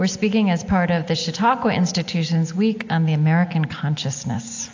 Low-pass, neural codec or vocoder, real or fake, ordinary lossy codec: 7.2 kHz; none; real; AAC, 48 kbps